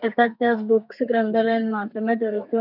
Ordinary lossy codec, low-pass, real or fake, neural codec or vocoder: none; 5.4 kHz; fake; codec, 32 kHz, 1.9 kbps, SNAC